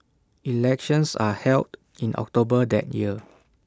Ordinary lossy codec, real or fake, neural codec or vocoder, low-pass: none; real; none; none